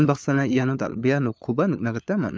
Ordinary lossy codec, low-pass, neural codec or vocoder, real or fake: none; none; codec, 16 kHz, 4 kbps, FunCodec, trained on LibriTTS, 50 frames a second; fake